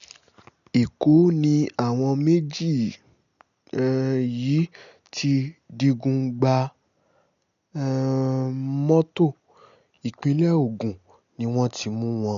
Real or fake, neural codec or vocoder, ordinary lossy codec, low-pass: real; none; none; 7.2 kHz